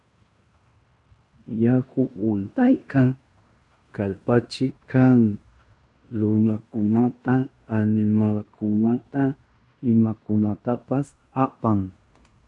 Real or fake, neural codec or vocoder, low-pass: fake; codec, 16 kHz in and 24 kHz out, 0.9 kbps, LongCat-Audio-Codec, fine tuned four codebook decoder; 10.8 kHz